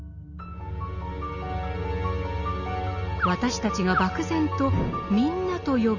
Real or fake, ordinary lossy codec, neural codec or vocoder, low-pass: real; none; none; 7.2 kHz